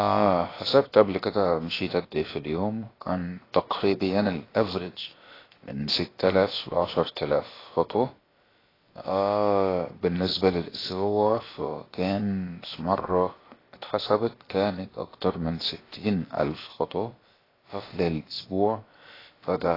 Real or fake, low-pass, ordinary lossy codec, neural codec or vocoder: fake; 5.4 kHz; AAC, 24 kbps; codec, 16 kHz, about 1 kbps, DyCAST, with the encoder's durations